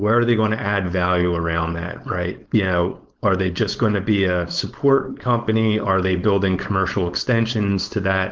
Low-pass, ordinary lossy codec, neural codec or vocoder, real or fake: 7.2 kHz; Opus, 16 kbps; codec, 16 kHz, 4.8 kbps, FACodec; fake